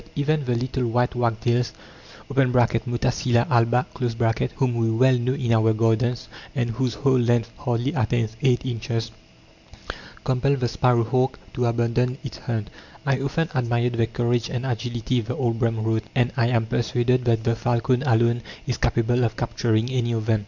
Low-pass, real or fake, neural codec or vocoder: 7.2 kHz; real; none